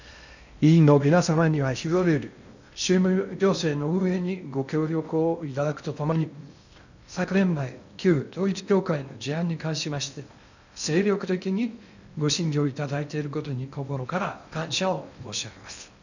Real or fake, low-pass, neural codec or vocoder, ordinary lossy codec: fake; 7.2 kHz; codec, 16 kHz in and 24 kHz out, 0.6 kbps, FocalCodec, streaming, 2048 codes; none